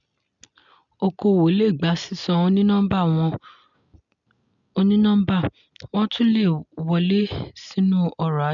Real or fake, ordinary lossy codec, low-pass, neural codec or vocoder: real; MP3, 96 kbps; 7.2 kHz; none